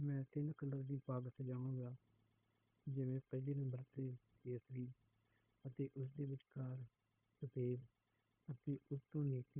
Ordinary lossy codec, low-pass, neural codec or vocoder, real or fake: Opus, 64 kbps; 3.6 kHz; codec, 16 kHz, 0.9 kbps, LongCat-Audio-Codec; fake